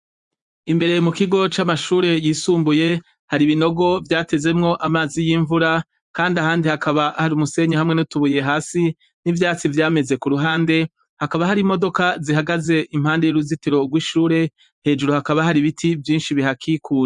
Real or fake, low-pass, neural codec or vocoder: fake; 10.8 kHz; vocoder, 24 kHz, 100 mel bands, Vocos